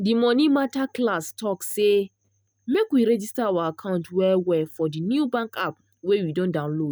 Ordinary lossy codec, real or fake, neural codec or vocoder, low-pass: none; real; none; none